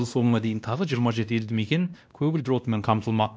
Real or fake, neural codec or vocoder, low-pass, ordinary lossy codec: fake; codec, 16 kHz, 1 kbps, X-Codec, WavLM features, trained on Multilingual LibriSpeech; none; none